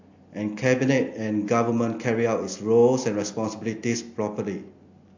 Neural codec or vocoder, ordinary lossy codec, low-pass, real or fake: none; AAC, 48 kbps; 7.2 kHz; real